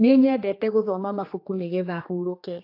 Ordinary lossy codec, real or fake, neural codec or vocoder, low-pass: AAC, 32 kbps; fake; codec, 16 kHz, 2 kbps, X-Codec, HuBERT features, trained on general audio; 5.4 kHz